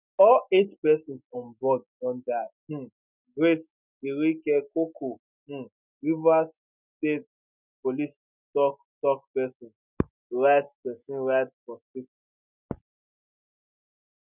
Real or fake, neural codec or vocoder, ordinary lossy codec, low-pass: real; none; none; 3.6 kHz